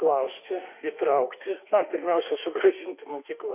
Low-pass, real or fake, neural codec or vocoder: 3.6 kHz; fake; autoencoder, 48 kHz, 32 numbers a frame, DAC-VAE, trained on Japanese speech